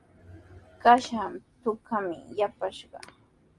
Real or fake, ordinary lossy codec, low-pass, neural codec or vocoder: real; Opus, 32 kbps; 10.8 kHz; none